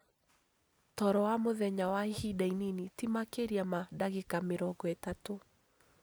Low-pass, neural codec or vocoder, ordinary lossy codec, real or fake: none; none; none; real